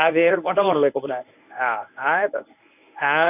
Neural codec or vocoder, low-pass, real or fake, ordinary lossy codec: codec, 24 kHz, 0.9 kbps, WavTokenizer, medium speech release version 1; 3.6 kHz; fake; none